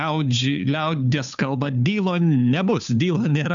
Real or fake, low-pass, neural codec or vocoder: fake; 7.2 kHz; codec, 16 kHz, 2 kbps, FunCodec, trained on Chinese and English, 25 frames a second